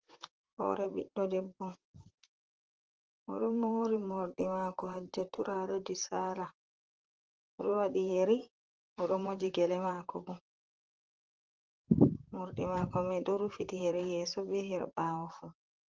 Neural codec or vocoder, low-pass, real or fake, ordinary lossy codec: vocoder, 44.1 kHz, 128 mel bands, Pupu-Vocoder; 7.2 kHz; fake; Opus, 16 kbps